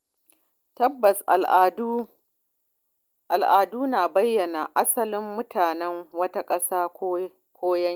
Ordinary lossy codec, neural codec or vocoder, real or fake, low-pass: Opus, 32 kbps; none; real; 19.8 kHz